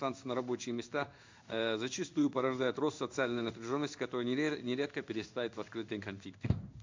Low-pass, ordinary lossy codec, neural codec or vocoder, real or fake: 7.2 kHz; MP3, 64 kbps; codec, 16 kHz in and 24 kHz out, 1 kbps, XY-Tokenizer; fake